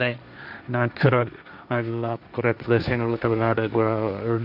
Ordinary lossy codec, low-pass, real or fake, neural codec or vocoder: none; 5.4 kHz; fake; codec, 16 kHz, 1.1 kbps, Voila-Tokenizer